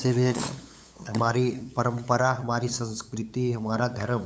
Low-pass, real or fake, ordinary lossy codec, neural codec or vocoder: none; fake; none; codec, 16 kHz, 8 kbps, FunCodec, trained on LibriTTS, 25 frames a second